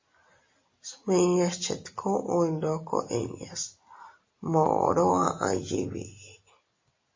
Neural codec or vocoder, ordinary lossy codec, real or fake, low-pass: none; MP3, 32 kbps; real; 7.2 kHz